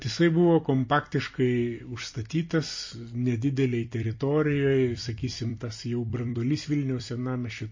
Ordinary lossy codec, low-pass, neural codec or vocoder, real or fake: MP3, 32 kbps; 7.2 kHz; vocoder, 44.1 kHz, 128 mel bands every 256 samples, BigVGAN v2; fake